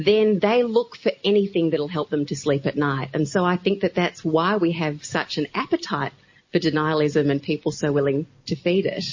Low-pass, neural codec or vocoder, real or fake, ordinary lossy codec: 7.2 kHz; none; real; MP3, 32 kbps